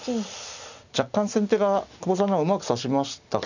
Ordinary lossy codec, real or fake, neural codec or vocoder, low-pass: none; real; none; 7.2 kHz